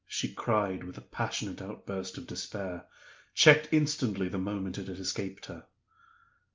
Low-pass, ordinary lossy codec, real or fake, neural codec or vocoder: 7.2 kHz; Opus, 32 kbps; real; none